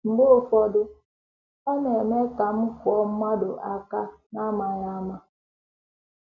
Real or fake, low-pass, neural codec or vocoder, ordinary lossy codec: real; 7.2 kHz; none; none